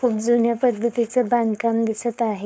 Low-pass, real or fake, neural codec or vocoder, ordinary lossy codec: none; fake; codec, 16 kHz, 4.8 kbps, FACodec; none